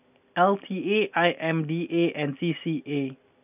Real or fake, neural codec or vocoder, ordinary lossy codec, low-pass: real; none; none; 3.6 kHz